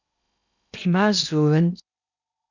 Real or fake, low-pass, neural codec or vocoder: fake; 7.2 kHz; codec, 16 kHz in and 24 kHz out, 0.8 kbps, FocalCodec, streaming, 65536 codes